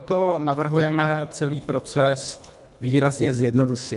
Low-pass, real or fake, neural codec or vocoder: 10.8 kHz; fake; codec, 24 kHz, 1.5 kbps, HILCodec